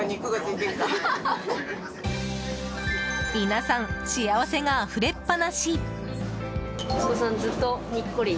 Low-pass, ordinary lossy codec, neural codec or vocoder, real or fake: none; none; none; real